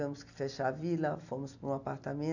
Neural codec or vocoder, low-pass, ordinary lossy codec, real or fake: none; 7.2 kHz; none; real